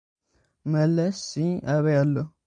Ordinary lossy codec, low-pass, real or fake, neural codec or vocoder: AAC, 64 kbps; 9.9 kHz; real; none